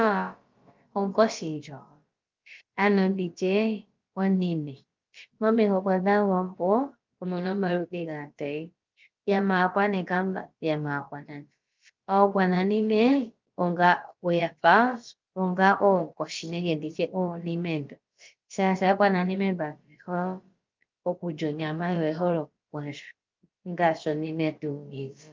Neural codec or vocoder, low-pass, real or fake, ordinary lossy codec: codec, 16 kHz, about 1 kbps, DyCAST, with the encoder's durations; 7.2 kHz; fake; Opus, 24 kbps